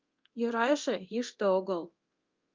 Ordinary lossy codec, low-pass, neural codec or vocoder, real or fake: Opus, 24 kbps; 7.2 kHz; codec, 24 kHz, 0.9 kbps, DualCodec; fake